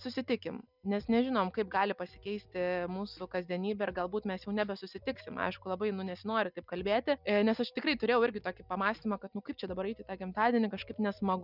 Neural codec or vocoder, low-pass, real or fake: none; 5.4 kHz; real